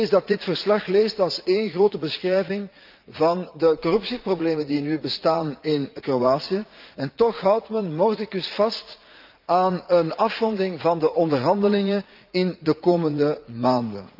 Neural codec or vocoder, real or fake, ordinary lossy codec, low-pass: vocoder, 44.1 kHz, 80 mel bands, Vocos; fake; Opus, 24 kbps; 5.4 kHz